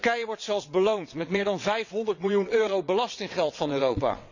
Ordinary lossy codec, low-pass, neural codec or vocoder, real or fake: none; 7.2 kHz; vocoder, 22.05 kHz, 80 mel bands, WaveNeXt; fake